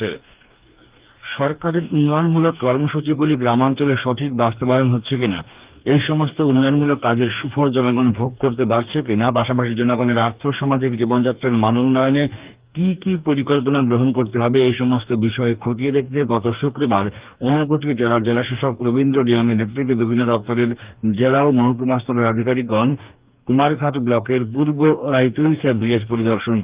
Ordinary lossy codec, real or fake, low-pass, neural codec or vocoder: Opus, 32 kbps; fake; 3.6 kHz; codec, 44.1 kHz, 2.6 kbps, DAC